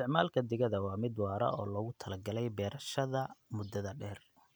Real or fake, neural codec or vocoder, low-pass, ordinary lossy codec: real; none; none; none